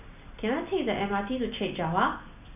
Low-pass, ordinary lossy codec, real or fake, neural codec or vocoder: 3.6 kHz; none; real; none